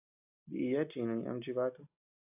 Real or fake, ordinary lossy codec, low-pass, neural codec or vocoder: real; AAC, 32 kbps; 3.6 kHz; none